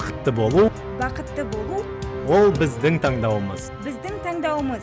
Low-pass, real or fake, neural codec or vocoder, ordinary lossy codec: none; real; none; none